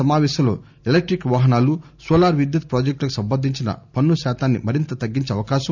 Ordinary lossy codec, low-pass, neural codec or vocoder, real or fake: none; 7.2 kHz; none; real